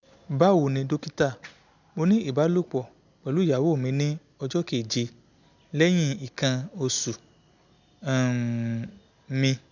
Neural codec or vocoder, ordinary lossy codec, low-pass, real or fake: none; none; 7.2 kHz; real